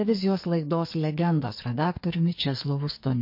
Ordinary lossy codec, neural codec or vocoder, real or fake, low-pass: MP3, 32 kbps; codec, 44.1 kHz, 2.6 kbps, SNAC; fake; 5.4 kHz